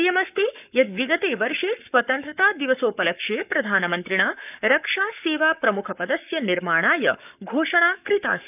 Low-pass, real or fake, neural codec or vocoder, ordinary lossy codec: 3.6 kHz; fake; codec, 44.1 kHz, 7.8 kbps, Pupu-Codec; none